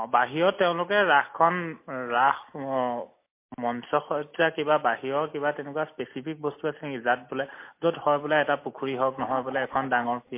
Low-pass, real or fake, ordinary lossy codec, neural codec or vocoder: 3.6 kHz; real; MP3, 24 kbps; none